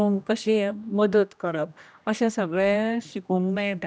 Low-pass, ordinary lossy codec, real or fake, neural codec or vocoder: none; none; fake; codec, 16 kHz, 1 kbps, X-Codec, HuBERT features, trained on general audio